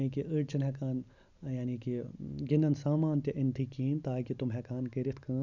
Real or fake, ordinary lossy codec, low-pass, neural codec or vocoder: real; none; 7.2 kHz; none